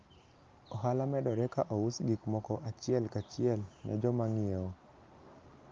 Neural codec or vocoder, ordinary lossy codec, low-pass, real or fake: none; Opus, 32 kbps; 7.2 kHz; real